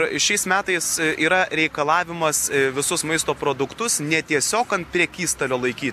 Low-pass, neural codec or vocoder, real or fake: 14.4 kHz; none; real